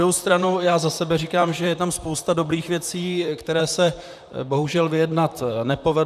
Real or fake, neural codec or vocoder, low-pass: fake; vocoder, 44.1 kHz, 128 mel bands, Pupu-Vocoder; 14.4 kHz